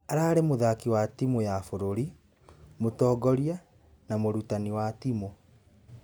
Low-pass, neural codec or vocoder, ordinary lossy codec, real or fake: none; none; none; real